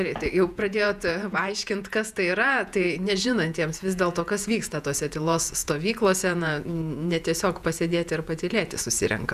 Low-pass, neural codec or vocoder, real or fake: 14.4 kHz; vocoder, 48 kHz, 128 mel bands, Vocos; fake